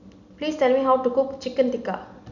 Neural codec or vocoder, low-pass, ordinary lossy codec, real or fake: none; 7.2 kHz; none; real